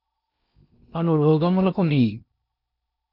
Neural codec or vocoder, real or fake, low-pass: codec, 16 kHz in and 24 kHz out, 0.8 kbps, FocalCodec, streaming, 65536 codes; fake; 5.4 kHz